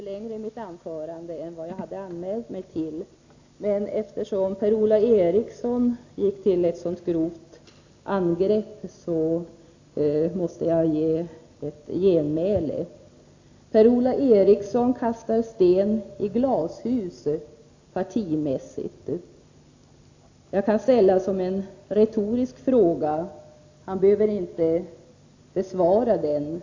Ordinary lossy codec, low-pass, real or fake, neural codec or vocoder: AAC, 48 kbps; 7.2 kHz; real; none